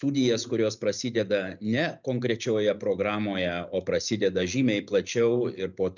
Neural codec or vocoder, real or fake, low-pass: none; real; 7.2 kHz